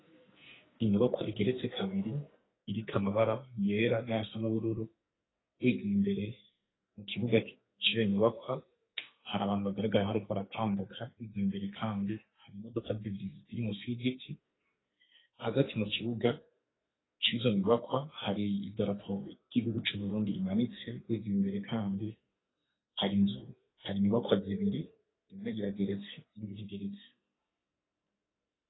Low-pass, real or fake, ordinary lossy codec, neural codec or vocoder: 7.2 kHz; fake; AAC, 16 kbps; codec, 44.1 kHz, 2.6 kbps, SNAC